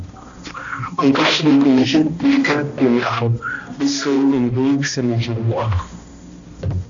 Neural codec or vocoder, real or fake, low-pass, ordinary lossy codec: codec, 16 kHz, 1 kbps, X-Codec, HuBERT features, trained on balanced general audio; fake; 7.2 kHz; AAC, 64 kbps